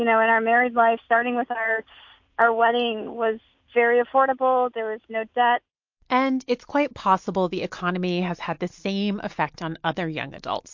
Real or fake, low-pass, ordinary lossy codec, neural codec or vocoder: real; 7.2 kHz; MP3, 48 kbps; none